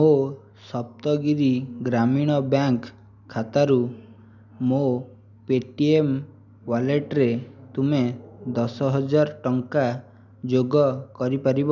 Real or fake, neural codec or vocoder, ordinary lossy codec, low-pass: real; none; none; 7.2 kHz